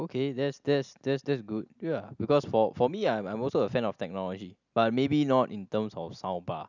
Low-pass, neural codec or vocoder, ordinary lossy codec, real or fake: 7.2 kHz; none; none; real